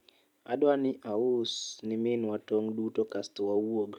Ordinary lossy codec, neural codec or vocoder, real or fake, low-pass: none; vocoder, 44.1 kHz, 128 mel bands every 256 samples, BigVGAN v2; fake; 19.8 kHz